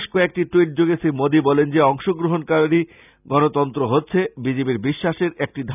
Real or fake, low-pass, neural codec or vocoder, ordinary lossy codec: real; 3.6 kHz; none; none